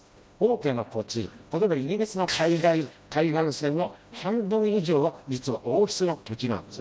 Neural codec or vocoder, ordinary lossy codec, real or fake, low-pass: codec, 16 kHz, 1 kbps, FreqCodec, smaller model; none; fake; none